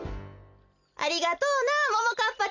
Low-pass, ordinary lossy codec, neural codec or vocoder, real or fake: 7.2 kHz; Opus, 64 kbps; none; real